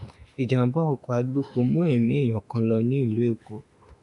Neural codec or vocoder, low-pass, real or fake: autoencoder, 48 kHz, 32 numbers a frame, DAC-VAE, trained on Japanese speech; 10.8 kHz; fake